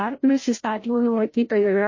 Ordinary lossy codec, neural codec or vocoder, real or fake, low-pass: MP3, 32 kbps; codec, 16 kHz, 0.5 kbps, FreqCodec, larger model; fake; 7.2 kHz